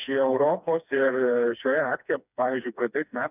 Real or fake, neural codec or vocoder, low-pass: fake; codec, 16 kHz, 2 kbps, FreqCodec, smaller model; 3.6 kHz